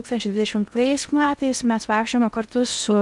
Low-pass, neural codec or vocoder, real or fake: 10.8 kHz; codec, 16 kHz in and 24 kHz out, 0.8 kbps, FocalCodec, streaming, 65536 codes; fake